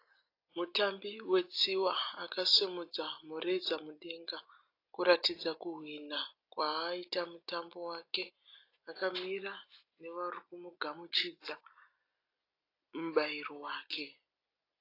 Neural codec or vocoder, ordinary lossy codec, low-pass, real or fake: none; AAC, 32 kbps; 5.4 kHz; real